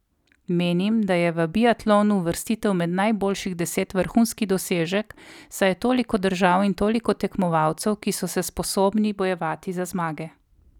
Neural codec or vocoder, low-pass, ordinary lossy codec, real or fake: none; 19.8 kHz; none; real